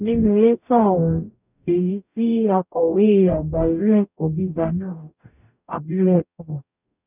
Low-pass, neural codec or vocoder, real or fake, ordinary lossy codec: 3.6 kHz; codec, 44.1 kHz, 0.9 kbps, DAC; fake; none